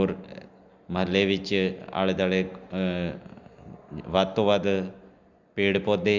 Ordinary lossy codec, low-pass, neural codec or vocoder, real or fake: Opus, 64 kbps; 7.2 kHz; none; real